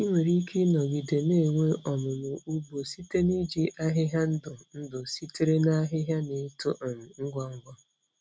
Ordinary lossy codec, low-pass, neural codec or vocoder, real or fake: none; none; none; real